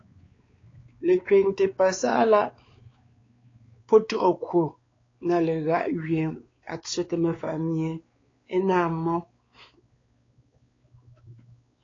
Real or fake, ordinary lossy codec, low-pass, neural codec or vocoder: fake; AAC, 32 kbps; 7.2 kHz; codec, 16 kHz, 4 kbps, X-Codec, WavLM features, trained on Multilingual LibriSpeech